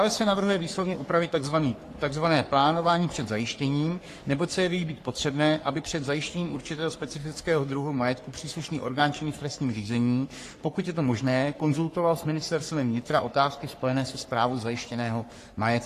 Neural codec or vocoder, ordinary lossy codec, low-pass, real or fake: codec, 44.1 kHz, 3.4 kbps, Pupu-Codec; AAC, 48 kbps; 14.4 kHz; fake